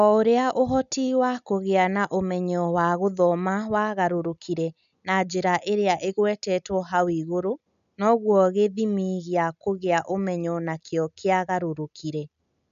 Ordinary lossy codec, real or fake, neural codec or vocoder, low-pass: none; real; none; 7.2 kHz